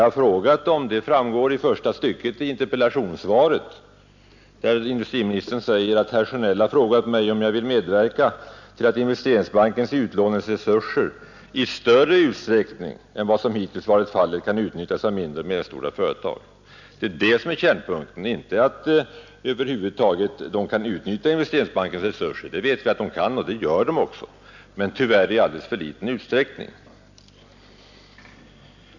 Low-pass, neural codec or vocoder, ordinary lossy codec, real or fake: 7.2 kHz; none; none; real